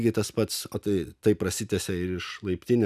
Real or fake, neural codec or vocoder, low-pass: real; none; 14.4 kHz